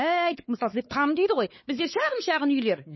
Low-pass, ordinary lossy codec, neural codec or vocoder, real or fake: 7.2 kHz; MP3, 24 kbps; codec, 16 kHz, 4 kbps, X-Codec, WavLM features, trained on Multilingual LibriSpeech; fake